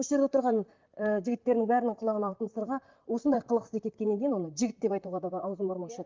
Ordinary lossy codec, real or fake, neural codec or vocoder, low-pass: Opus, 24 kbps; fake; vocoder, 44.1 kHz, 128 mel bands, Pupu-Vocoder; 7.2 kHz